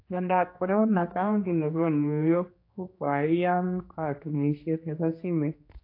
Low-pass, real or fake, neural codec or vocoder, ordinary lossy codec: 5.4 kHz; fake; codec, 16 kHz, 2 kbps, X-Codec, HuBERT features, trained on general audio; AAC, 32 kbps